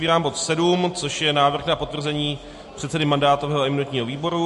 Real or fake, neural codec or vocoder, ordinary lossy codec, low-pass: real; none; MP3, 48 kbps; 14.4 kHz